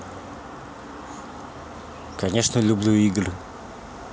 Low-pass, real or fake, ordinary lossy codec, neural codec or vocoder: none; real; none; none